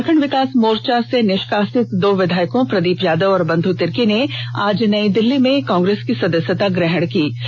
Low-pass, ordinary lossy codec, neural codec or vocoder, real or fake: none; none; none; real